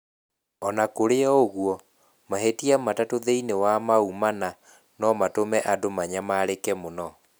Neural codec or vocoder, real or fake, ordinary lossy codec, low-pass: none; real; none; none